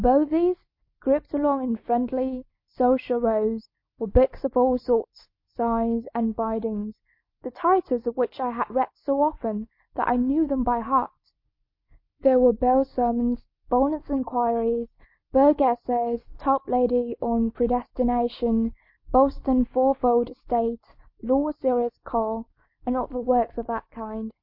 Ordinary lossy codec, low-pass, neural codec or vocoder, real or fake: MP3, 32 kbps; 5.4 kHz; none; real